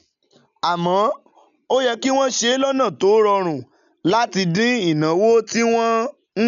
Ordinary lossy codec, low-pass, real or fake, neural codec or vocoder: none; 7.2 kHz; real; none